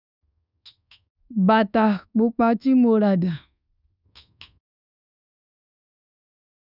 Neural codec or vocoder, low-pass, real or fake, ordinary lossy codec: codec, 24 kHz, 1.2 kbps, DualCodec; 5.4 kHz; fake; none